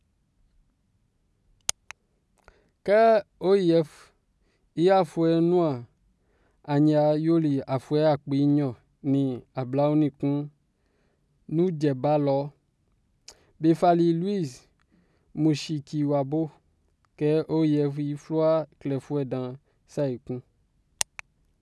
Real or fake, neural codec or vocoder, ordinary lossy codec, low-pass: real; none; none; none